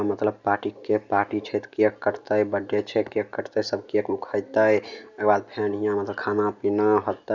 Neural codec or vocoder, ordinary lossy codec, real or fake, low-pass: none; none; real; 7.2 kHz